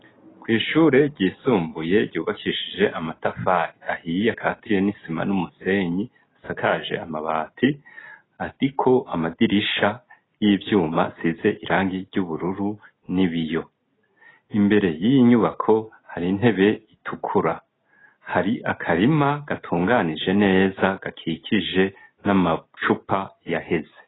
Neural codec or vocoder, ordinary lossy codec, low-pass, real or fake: none; AAC, 16 kbps; 7.2 kHz; real